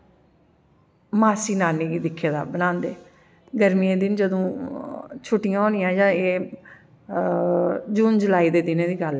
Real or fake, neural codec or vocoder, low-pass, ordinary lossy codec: real; none; none; none